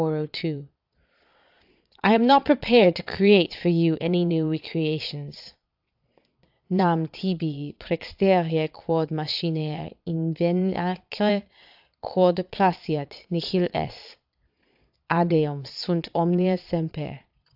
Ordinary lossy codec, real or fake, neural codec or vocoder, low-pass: AAC, 48 kbps; fake; vocoder, 22.05 kHz, 80 mel bands, Vocos; 5.4 kHz